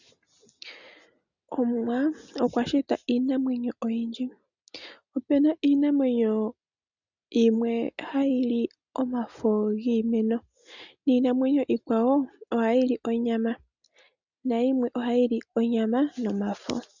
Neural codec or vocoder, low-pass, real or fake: none; 7.2 kHz; real